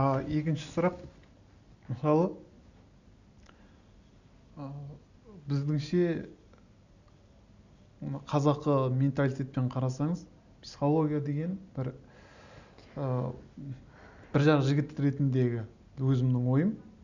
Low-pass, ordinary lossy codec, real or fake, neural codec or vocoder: 7.2 kHz; none; real; none